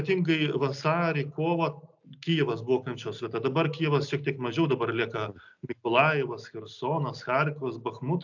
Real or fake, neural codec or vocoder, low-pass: real; none; 7.2 kHz